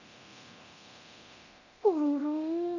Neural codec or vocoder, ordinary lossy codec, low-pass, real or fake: codec, 24 kHz, 0.9 kbps, DualCodec; none; 7.2 kHz; fake